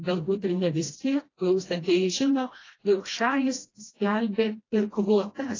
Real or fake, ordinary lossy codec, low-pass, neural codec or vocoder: fake; AAC, 32 kbps; 7.2 kHz; codec, 16 kHz, 1 kbps, FreqCodec, smaller model